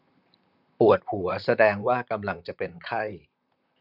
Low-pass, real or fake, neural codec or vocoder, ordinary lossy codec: 5.4 kHz; fake; vocoder, 44.1 kHz, 128 mel bands, Pupu-Vocoder; none